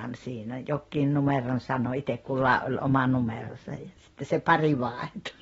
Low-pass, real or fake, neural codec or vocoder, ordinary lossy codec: 10.8 kHz; real; none; AAC, 24 kbps